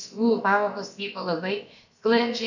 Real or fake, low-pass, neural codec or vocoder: fake; 7.2 kHz; codec, 16 kHz, about 1 kbps, DyCAST, with the encoder's durations